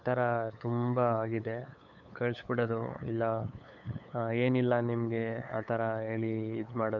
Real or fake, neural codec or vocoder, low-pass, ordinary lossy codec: fake; codec, 16 kHz, 8 kbps, FunCodec, trained on LibriTTS, 25 frames a second; 7.2 kHz; MP3, 64 kbps